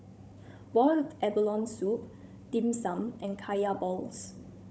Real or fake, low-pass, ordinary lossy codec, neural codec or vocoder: fake; none; none; codec, 16 kHz, 16 kbps, FunCodec, trained on Chinese and English, 50 frames a second